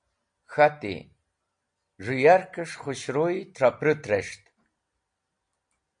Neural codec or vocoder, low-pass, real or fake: none; 9.9 kHz; real